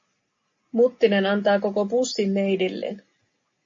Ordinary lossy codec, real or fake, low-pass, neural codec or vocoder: MP3, 32 kbps; real; 7.2 kHz; none